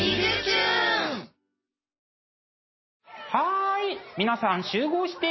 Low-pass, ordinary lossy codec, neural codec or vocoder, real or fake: 7.2 kHz; MP3, 24 kbps; none; real